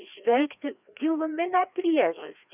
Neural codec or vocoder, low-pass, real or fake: codec, 16 kHz, 2 kbps, FreqCodec, larger model; 3.6 kHz; fake